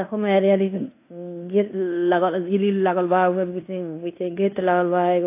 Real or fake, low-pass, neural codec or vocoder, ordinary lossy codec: fake; 3.6 kHz; codec, 16 kHz in and 24 kHz out, 0.9 kbps, LongCat-Audio-Codec, fine tuned four codebook decoder; AAC, 24 kbps